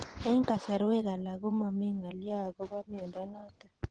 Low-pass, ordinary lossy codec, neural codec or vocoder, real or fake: 9.9 kHz; Opus, 16 kbps; vocoder, 24 kHz, 100 mel bands, Vocos; fake